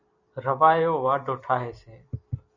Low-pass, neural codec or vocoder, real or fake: 7.2 kHz; vocoder, 44.1 kHz, 128 mel bands every 256 samples, BigVGAN v2; fake